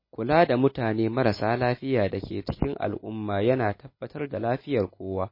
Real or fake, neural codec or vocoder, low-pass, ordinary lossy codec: real; none; 5.4 kHz; MP3, 24 kbps